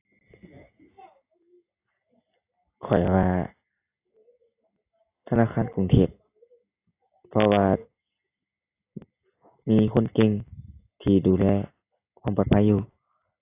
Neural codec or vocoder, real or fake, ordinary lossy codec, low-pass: none; real; AAC, 24 kbps; 3.6 kHz